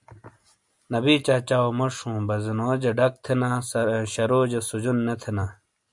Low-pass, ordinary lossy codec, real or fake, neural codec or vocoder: 10.8 kHz; MP3, 96 kbps; real; none